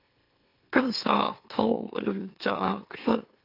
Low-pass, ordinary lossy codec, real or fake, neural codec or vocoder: 5.4 kHz; AAC, 32 kbps; fake; autoencoder, 44.1 kHz, a latent of 192 numbers a frame, MeloTTS